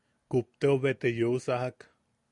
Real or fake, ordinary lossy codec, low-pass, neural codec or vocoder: real; MP3, 96 kbps; 10.8 kHz; none